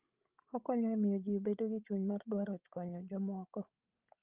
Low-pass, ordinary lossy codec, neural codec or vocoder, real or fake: 3.6 kHz; Opus, 32 kbps; codec, 16 kHz, 16 kbps, FreqCodec, larger model; fake